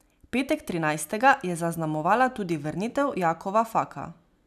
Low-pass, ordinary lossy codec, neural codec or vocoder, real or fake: 14.4 kHz; none; none; real